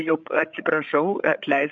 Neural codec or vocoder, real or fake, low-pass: codec, 16 kHz, 16 kbps, FreqCodec, larger model; fake; 7.2 kHz